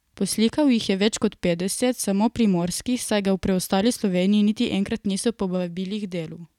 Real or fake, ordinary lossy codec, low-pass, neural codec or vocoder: real; none; 19.8 kHz; none